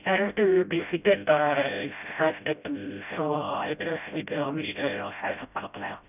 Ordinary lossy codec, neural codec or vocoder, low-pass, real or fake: none; codec, 16 kHz, 0.5 kbps, FreqCodec, smaller model; 3.6 kHz; fake